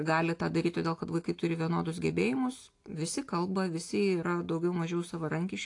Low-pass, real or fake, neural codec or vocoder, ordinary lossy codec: 10.8 kHz; real; none; AAC, 48 kbps